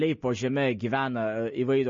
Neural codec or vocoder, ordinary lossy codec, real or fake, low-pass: none; MP3, 32 kbps; real; 7.2 kHz